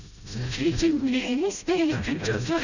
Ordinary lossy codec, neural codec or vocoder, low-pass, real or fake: none; codec, 16 kHz, 0.5 kbps, FreqCodec, smaller model; 7.2 kHz; fake